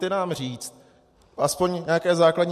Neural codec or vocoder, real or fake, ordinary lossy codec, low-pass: none; real; MP3, 64 kbps; 14.4 kHz